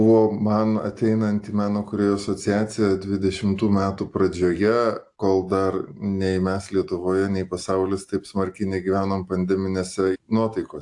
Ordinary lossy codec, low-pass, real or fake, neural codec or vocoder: AAC, 64 kbps; 10.8 kHz; real; none